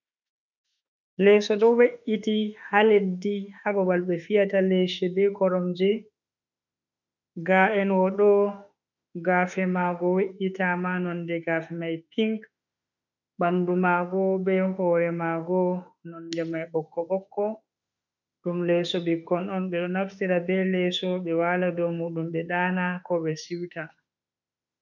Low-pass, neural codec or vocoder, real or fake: 7.2 kHz; autoencoder, 48 kHz, 32 numbers a frame, DAC-VAE, trained on Japanese speech; fake